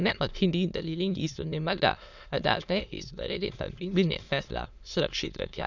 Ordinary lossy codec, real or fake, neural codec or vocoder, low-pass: none; fake; autoencoder, 22.05 kHz, a latent of 192 numbers a frame, VITS, trained on many speakers; 7.2 kHz